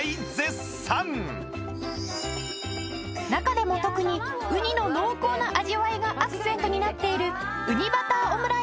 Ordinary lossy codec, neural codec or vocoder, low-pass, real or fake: none; none; none; real